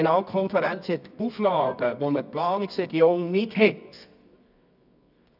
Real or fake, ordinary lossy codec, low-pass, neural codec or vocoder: fake; none; 5.4 kHz; codec, 24 kHz, 0.9 kbps, WavTokenizer, medium music audio release